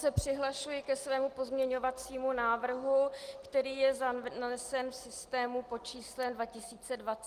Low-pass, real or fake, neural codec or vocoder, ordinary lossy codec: 14.4 kHz; real; none; Opus, 24 kbps